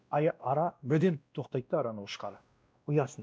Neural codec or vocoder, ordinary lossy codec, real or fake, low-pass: codec, 16 kHz, 1 kbps, X-Codec, WavLM features, trained on Multilingual LibriSpeech; none; fake; none